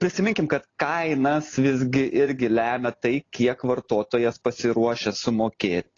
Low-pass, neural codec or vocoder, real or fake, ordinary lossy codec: 7.2 kHz; none; real; AAC, 32 kbps